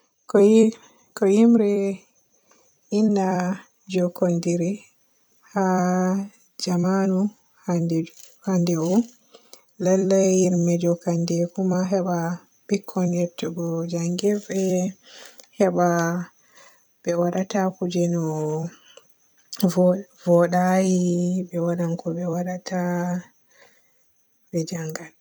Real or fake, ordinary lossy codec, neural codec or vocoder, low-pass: fake; none; vocoder, 44.1 kHz, 128 mel bands every 256 samples, BigVGAN v2; none